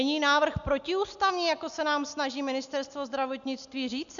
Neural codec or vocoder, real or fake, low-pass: none; real; 7.2 kHz